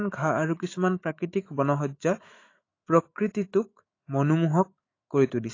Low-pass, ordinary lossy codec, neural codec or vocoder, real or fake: 7.2 kHz; AAC, 32 kbps; autoencoder, 48 kHz, 128 numbers a frame, DAC-VAE, trained on Japanese speech; fake